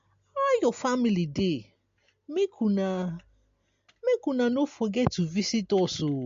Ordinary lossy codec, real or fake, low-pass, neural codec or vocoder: MP3, 48 kbps; real; 7.2 kHz; none